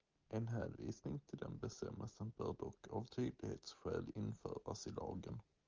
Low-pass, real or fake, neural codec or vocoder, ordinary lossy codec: 7.2 kHz; real; none; Opus, 32 kbps